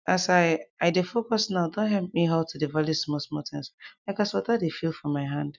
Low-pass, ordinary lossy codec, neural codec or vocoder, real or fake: 7.2 kHz; none; none; real